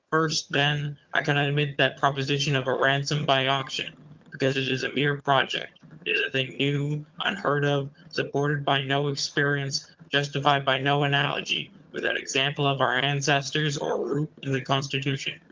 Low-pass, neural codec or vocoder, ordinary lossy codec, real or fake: 7.2 kHz; vocoder, 22.05 kHz, 80 mel bands, HiFi-GAN; Opus, 24 kbps; fake